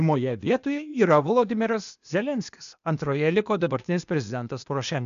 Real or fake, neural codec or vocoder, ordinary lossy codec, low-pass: fake; codec, 16 kHz, 0.8 kbps, ZipCodec; MP3, 96 kbps; 7.2 kHz